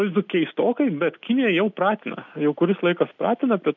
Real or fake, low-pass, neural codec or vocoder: real; 7.2 kHz; none